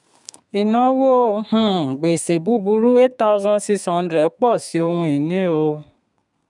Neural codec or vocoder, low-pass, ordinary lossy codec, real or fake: codec, 32 kHz, 1.9 kbps, SNAC; 10.8 kHz; none; fake